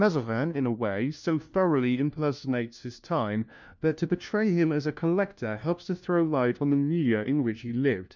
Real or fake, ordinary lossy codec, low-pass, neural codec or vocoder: fake; MP3, 64 kbps; 7.2 kHz; codec, 16 kHz, 1 kbps, FunCodec, trained on LibriTTS, 50 frames a second